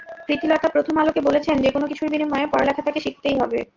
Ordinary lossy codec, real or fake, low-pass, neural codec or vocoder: Opus, 32 kbps; real; 7.2 kHz; none